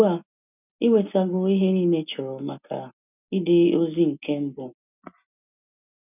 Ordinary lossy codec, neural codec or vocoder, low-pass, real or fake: none; none; 3.6 kHz; real